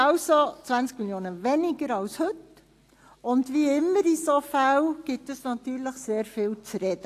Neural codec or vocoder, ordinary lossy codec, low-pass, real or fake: none; AAC, 64 kbps; 14.4 kHz; real